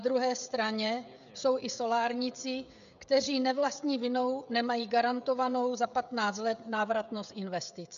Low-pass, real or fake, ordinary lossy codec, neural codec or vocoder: 7.2 kHz; fake; MP3, 96 kbps; codec, 16 kHz, 16 kbps, FreqCodec, smaller model